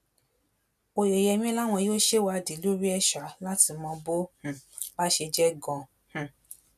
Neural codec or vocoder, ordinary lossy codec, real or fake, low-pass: none; none; real; 14.4 kHz